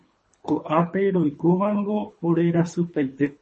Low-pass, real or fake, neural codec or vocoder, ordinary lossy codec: 10.8 kHz; fake; codec, 24 kHz, 3 kbps, HILCodec; MP3, 32 kbps